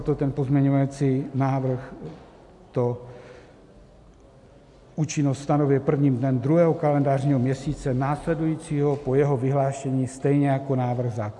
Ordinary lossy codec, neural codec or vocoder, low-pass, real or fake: AAC, 64 kbps; none; 10.8 kHz; real